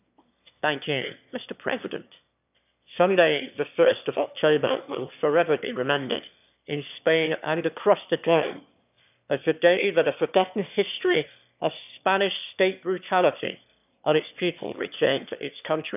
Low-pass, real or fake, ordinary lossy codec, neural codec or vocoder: 3.6 kHz; fake; none; autoencoder, 22.05 kHz, a latent of 192 numbers a frame, VITS, trained on one speaker